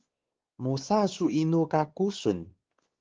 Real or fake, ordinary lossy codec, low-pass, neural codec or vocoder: fake; Opus, 16 kbps; 7.2 kHz; codec, 16 kHz, 4 kbps, X-Codec, WavLM features, trained on Multilingual LibriSpeech